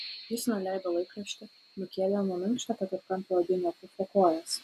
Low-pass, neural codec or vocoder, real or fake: 14.4 kHz; none; real